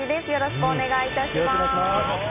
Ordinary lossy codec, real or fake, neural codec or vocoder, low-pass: none; real; none; 3.6 kHz